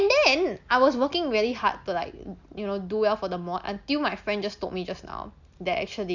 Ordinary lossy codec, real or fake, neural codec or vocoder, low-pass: Opus, 64 kbps; real; none; 7.2 kHz